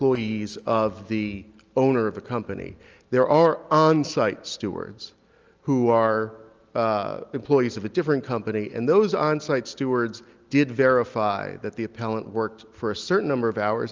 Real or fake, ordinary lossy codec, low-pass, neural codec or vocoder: real; Opus, 24 kbps; 7.2 kHz; none